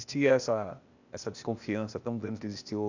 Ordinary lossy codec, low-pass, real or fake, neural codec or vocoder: none; 7.2 kHz; fake; codec, 16 kHz, 0.8 kbps, ZipCodec